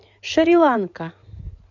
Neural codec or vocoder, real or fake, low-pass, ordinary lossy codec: codec, 16 kHz in and 24 kHz out, 2.2 kbps, FireRedTTS-2 codec; fake; 7.2 kHz; none